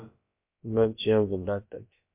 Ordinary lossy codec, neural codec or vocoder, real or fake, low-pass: MP3, 32 kbps; codec, 16 kHz, about 1 kbps, DyCAST, with the encoder's durations; fake; 3.6 kHz